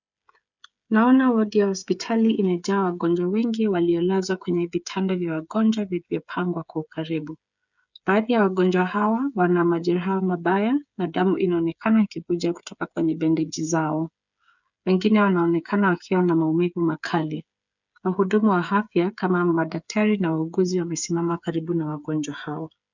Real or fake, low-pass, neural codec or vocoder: fake; 7.2 kHz; codec, 16 kHz, 8 kbps, FreqCodec, smaller model